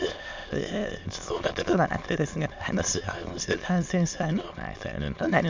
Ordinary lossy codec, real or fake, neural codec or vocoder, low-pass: MP3, 64 kbps; fake; autoencoder, 22.05 kHz, a latent of 192 numbers a frame, VITS, trained on many speakers; 7.2 kHz